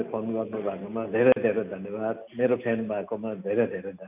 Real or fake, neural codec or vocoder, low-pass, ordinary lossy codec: real; none; 3.6 kHz; MP3, 32 kbps